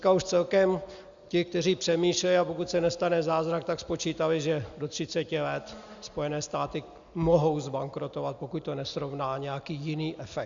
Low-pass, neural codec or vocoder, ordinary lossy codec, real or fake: 7.2 kHz; none; Opus, 64 kbps; real